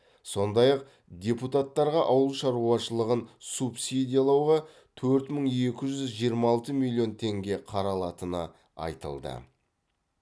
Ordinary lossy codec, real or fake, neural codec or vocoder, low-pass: none; real; none; none